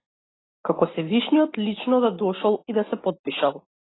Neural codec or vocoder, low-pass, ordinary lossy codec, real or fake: none; 7.2 kHz; AAC, 16 kbps; real